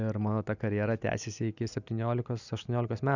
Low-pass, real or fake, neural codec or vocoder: 7.2 kHz; real; none